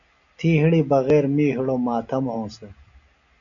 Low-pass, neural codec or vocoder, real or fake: 7.2 kHz; none; real